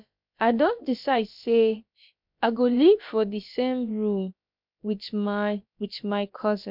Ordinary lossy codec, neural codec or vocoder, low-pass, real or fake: MP3, 48 kbps; codec, 16 kHz, about 1 kbps, DyCAST, with the encoder's durations; 5.4 kHz; fake